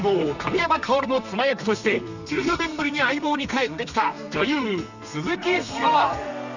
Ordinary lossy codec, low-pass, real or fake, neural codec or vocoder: none; 7.2 kHz; fake; codec, 32 kHz, 1.9 kbps, SNAC